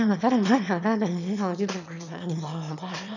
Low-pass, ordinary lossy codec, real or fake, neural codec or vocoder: 7.2 kHz; none; fake; autoencoder, 22.05 kHz, a latent of 192 numbers a frame, VITS, trained on one speaker